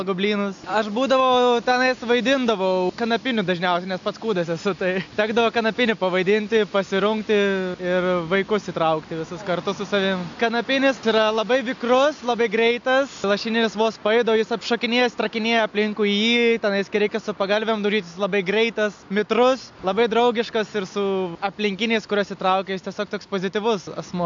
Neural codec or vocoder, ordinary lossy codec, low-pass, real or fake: none; AAC, 64 kbps; 7.2 kHz; real